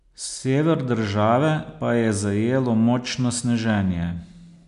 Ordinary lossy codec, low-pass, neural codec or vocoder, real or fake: none; 10.8 kHz; none; real